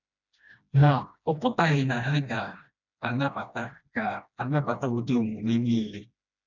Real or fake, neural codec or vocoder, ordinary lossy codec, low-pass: fake; codec, 16 kHz, 1 kbps, FreqCodec, smaller model; none; 7.2 kHz